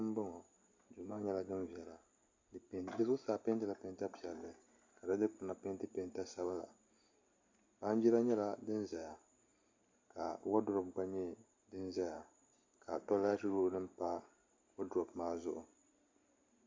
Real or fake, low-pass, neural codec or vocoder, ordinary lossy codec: real; 7.2 kHz; none; MP3, 48 kbps